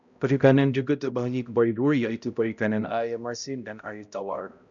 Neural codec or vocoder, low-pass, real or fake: codec, 16 kHz, 0.5 kbps, X-Codec, HuBERT features, trained on balanced general audio; 7.2 kHz; fake